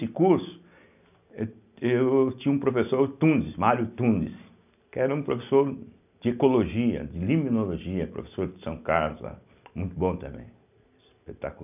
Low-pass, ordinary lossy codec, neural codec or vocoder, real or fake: 3.6 kHz; none; none; real